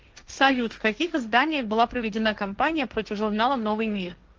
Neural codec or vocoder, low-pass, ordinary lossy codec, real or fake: codec, 16 kHz, 1.1 kbps, Voila-Tokenizer; 7.2 kHz; Opus, 24 kbps; fake